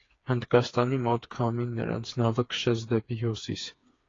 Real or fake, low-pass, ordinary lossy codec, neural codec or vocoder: fake; 7.2 kHz; AAC, 32 kbps; codec, 16 kHz, 4 kbps, FreqCodec, smaller model